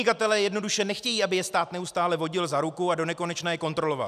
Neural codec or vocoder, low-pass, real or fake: none; 14.4 kHz; real